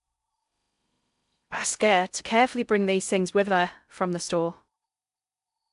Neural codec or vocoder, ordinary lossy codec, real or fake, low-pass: codec, 16 kHz in and 24 kHz out, 0.6 kbps, FocalCodec, streaming, 4096 codes; AAC, 96 kbps; fake; 10.8 kHz